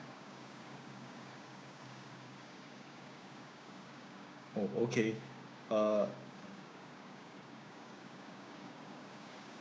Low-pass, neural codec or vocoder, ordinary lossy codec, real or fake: none; codec, 16 kHz, 6 kbps, DAC; none; fake